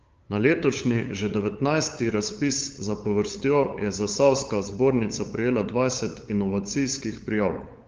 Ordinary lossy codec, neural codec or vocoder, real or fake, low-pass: Opus, 32 kbps; codec, 16 kHz, 8 kbps, FunCodec, trained on LibriTTS, 25 frames a second; fake; 7.2 kHz